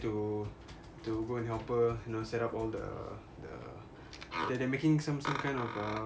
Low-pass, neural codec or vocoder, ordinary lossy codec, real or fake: none; none; none; real